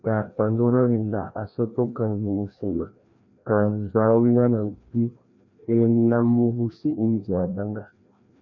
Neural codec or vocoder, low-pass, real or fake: codec, 16 kHz, 1 kbps, FreqCodec, larger model; 7.2 kHz; fake